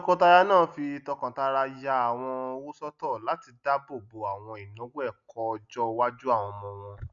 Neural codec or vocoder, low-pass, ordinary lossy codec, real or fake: none; 7.2 kHz; none; real